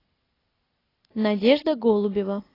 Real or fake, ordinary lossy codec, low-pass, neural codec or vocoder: real; AAC, 24 kbps; 5.4 kHz; none